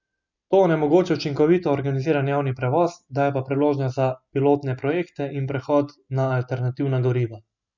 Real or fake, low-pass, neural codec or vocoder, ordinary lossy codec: real; 7.2 kHz; none; none